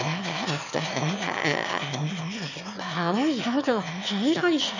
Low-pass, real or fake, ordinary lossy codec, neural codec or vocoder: 7.2 kHz; fake; none; autoencoder, 22.05 kHz, a latent of 192 numbers a frame, VITS, trained on one speaker